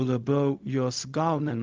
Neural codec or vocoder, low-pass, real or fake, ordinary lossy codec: codec, 16 kHz, 0.4 kbps, LongCat-Audio-Codec; 7.2 kHz; fake; Opus, 24 kbps